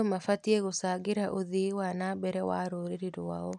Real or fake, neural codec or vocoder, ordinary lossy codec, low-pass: real; none; none; none